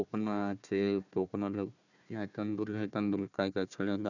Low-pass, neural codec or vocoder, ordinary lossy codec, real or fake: 7.2 kHz; codec, 16 kHz, 1 kbps, FunCodec, trained on Chinese and English, 50 frames a second; none; fake